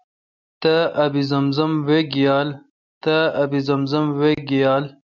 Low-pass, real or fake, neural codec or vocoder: 7.2 kHz; real; none